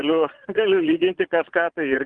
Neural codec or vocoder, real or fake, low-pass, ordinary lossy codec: vocoder, 22.05 kHz, 80 mel bands, Vocos; fake; 9.9 kHz; Opus, 24 kbps